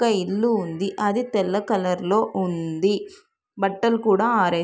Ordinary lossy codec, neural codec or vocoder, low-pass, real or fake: none; none; none; real